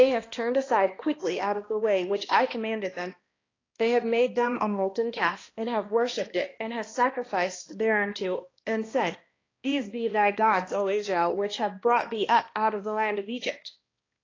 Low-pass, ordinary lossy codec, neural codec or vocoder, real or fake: 7.2 kHz; AAC, 32 kbps; codec, 16 kHz, 1 kbps, X-Codec, HuBERT features, trained on balanced general audio; fake